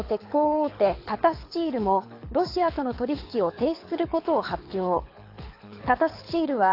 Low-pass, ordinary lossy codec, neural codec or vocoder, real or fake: 5.4 kHz; AAC, 32 kbps; codec, 24 kHz, 6 kbps, HILCodec; fake